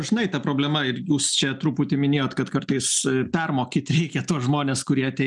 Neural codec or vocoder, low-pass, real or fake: none; 10.8 kHz; real